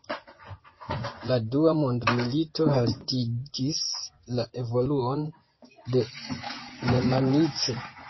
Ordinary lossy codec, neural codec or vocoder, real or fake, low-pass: MP3, 24 kbps; vocoder, 24 kHz, 100 mel bands, Vocos; fake; 7.2 kHz